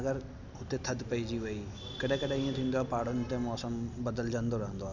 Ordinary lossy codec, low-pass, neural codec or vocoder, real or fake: none; 7.2 kHz; none; real